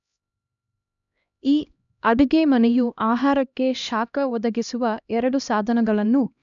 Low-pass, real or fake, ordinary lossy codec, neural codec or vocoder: 7.2 kHz; fake; none; codec, 16 kHz, 1 kbps, X-Codec, HuBERT features, trained on LibriSpeech